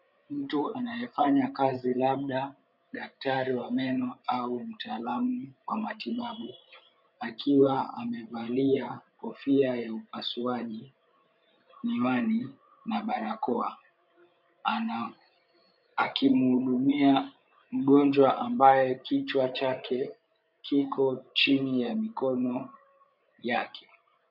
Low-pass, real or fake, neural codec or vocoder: 5.4 kHz; fake; codec, 16 kHz, 16 kbps, FreqCodec, larger model